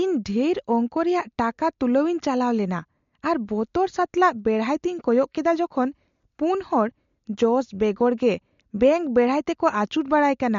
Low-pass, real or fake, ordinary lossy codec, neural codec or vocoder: 7.2 kHz; real; MP3, 48 kbps; none